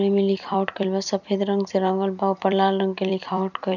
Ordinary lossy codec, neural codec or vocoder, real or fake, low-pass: none; vocoder, 44.1 kHz, 128 mel bands every 256 samples, BigVGAN v2; fake; 7.2 kHz